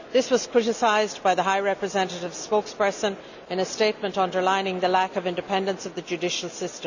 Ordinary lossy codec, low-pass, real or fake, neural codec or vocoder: none; 7.2 kHz; real; none